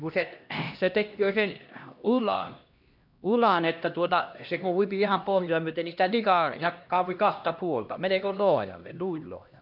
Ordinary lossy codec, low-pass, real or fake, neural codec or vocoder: none; 5.4 kHz; fake; codec, 16 kHz, 1 kbps, X-Codec, HuBERT features, trained on LibriSpeech